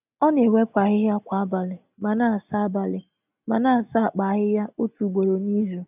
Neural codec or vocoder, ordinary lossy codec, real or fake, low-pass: none; none; real; 3.6 kHz